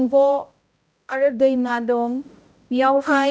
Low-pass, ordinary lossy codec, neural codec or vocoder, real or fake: none; none; codec, 16 kHz, 0.5 kbps, X-Codec, HuBERT features, trained on balanced general audio; fake